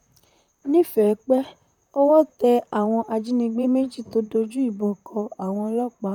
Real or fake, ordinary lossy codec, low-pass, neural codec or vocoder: fake; none; 19.8 kHz; vocoder, 44.1 kHz, 128 mel bands, Pupu-Vocoder